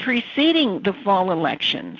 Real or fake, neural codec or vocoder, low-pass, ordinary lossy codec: real; none; 7.2 kHz; AAC, 48 kbps